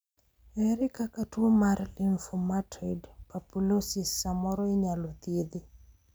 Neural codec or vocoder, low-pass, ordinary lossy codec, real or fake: none; none; none; real